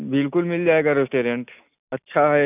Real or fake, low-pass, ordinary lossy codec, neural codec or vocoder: real; 3.6 kHz; none; none